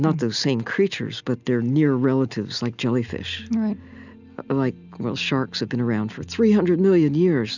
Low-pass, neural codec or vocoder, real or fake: 7.2 kHz; none; real